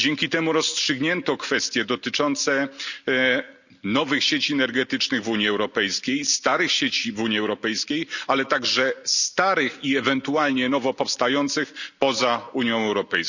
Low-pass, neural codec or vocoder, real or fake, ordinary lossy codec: 7.2 kHz; none; real; none